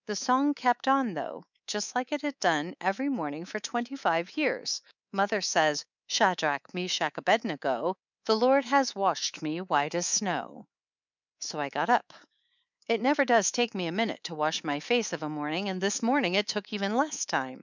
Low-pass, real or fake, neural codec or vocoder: 7.2 kHz; fake; codec, 24 kHz, 3.1 kbps, DualCodec